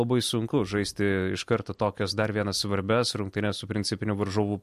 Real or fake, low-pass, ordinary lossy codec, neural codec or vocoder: real; 14.4 kHz; MP3, 64 kbps; none